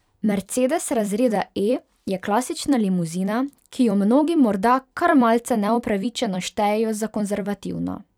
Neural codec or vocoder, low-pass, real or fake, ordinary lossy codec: vocoder, 44.1 kHz, 128 mel bands every 256 samples, BigVGAN v2; 19.8 kHz; fake; none